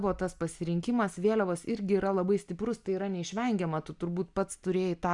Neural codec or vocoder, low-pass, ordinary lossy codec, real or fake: none; 10.8 kHz; MP3, 96 kbps; real